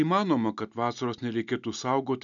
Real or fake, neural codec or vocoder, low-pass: real; none; 7.2 kHz